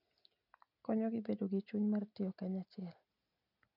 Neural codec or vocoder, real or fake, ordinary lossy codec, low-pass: none; real; none; 5.4 kHz